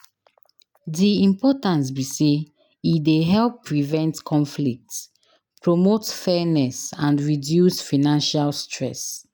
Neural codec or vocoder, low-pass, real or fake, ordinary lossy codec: none; none; real; none